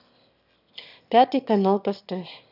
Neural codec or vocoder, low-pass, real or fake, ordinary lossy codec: autoencoder, 22.05 kHz, a latent of 192 numbers a frame, VITS, trained on one speaker; 5.4 kHz; fake; none